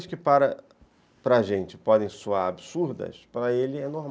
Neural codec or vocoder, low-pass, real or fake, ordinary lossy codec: none; none; real; none